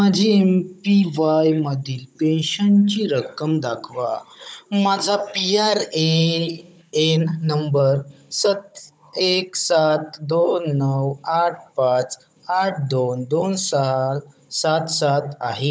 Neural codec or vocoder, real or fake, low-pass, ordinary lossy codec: codec, 16 kHz, 16 kbps, FunCodec, trained on Chinese and English, 50 frames a second; fake; none; none